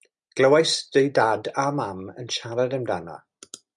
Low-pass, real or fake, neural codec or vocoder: 10.8 kHz; real; none